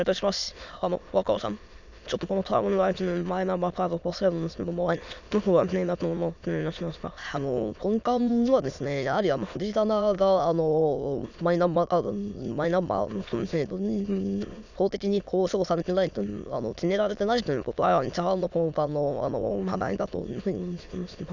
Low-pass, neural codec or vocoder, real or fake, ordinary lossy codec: 7.2 kHz; autoencoder, 22.05 kHz, a latent of 192 numbers a frame, VITS, trained on many speakers; fake; none